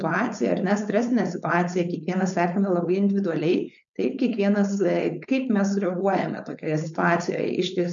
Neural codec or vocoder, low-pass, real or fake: codec, 16 kHz, 4.8 kbps, FACodec; 7.2 kHz; fake